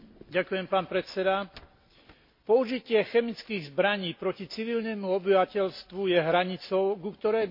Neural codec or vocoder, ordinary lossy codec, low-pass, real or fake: none; MP3, 32 kbps; 5.4 kHz; real